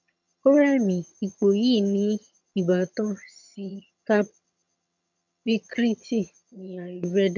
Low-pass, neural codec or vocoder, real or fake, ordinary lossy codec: 7.2 kHz; vocoder, 22.05 kHz, 80 mel bands, HiFi-GAN; fake; none